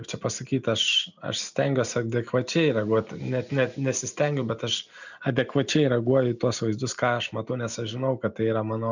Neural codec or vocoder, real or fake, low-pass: none; real; 7.2 kHz